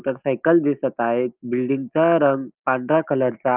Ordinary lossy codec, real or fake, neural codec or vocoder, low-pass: Opus, 24 kbps; real; none; 3.6 kHz